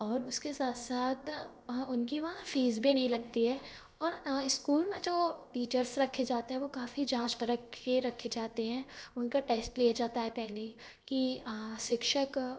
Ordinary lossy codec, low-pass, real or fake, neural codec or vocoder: none; none; fake; codec, 16 kHz, 0.7 kbps, FocalCodec